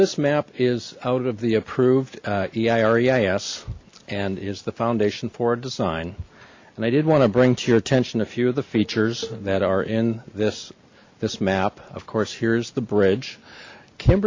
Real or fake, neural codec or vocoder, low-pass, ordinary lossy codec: real; none; 7.2 kHz; MP3, 48 kbps